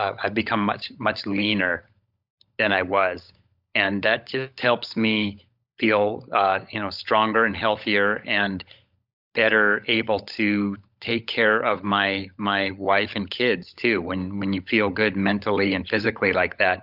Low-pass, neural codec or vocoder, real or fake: 5.4 kHz; codec, 16 kHz, 16 kbps, FunCodec, trained on LibriTTS, 50 frames a second; fake